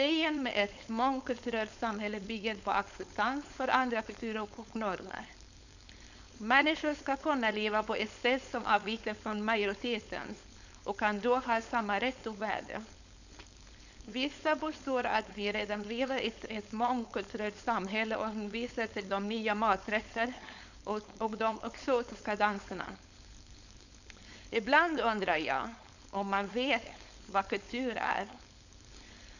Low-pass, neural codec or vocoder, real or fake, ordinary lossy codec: 7.2 kHz; codec, 16 kHz, 4.8 kbps, FACodec; fake; none